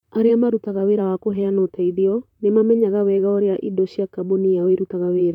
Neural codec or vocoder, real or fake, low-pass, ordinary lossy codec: vocoder, 44.1 kHz, 128 mel bands, Pupu-Vocoder; fake; 19.8 kHz; none